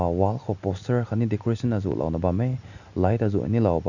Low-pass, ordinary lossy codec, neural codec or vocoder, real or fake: 7.2 kHz; none; none; real